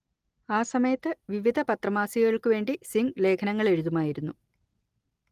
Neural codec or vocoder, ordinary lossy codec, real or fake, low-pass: none; Opus, 24 kbps; real; 14.4 kHz